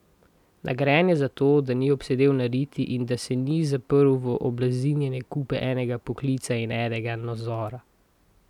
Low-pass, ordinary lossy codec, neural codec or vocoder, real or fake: 19.8 kHz; none; none; real